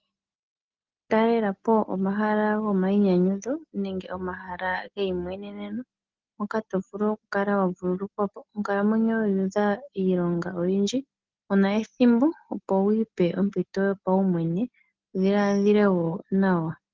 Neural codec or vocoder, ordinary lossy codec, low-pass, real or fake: none; Opus, 16 kbps; 7.2 kHz; real